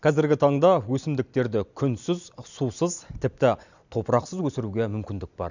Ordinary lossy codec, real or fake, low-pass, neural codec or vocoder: none; real; 7.2 kHz; none